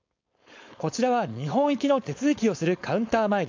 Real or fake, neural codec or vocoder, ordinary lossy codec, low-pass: fake; codec, 16 kHz, 4.8 kbps, FACodec; AAC, 48 kbps; 7.2 kHz